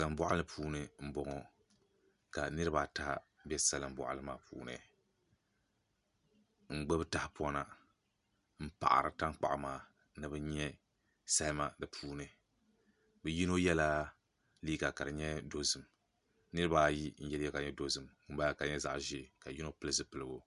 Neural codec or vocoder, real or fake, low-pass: none; real; 10.8 kHz